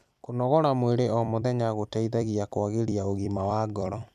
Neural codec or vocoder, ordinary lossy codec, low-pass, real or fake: vocoder, 44.1 kHz, 128 mel bands every 256 samples, BigVGAN v2; none; 14.4 kHz; fake